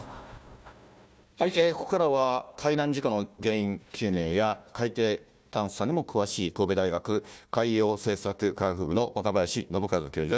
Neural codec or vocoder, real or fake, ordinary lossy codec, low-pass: codec, 16 kHz, 1 kbps, FunCodec, trained on Chinese and English, 50 frames a second; fake; none; none